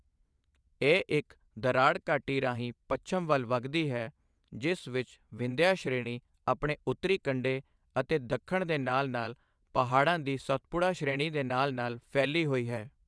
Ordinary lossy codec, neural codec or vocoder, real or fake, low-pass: none; vocoder, 22.05 kHz, 80 mel bands, WaveNeXt; fake; none